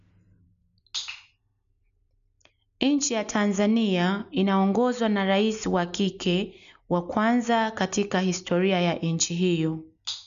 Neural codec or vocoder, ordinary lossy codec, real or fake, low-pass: none; none; real; 7.2 kHz